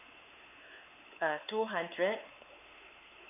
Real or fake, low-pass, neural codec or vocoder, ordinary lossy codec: fake; 3.6 kHz; codec, 16 kHz, 4 kbps, X-Codec, WavLM features, trained on Multilingual LibriSpeech; none